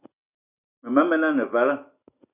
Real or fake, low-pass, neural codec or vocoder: real; 3.6 kHz; none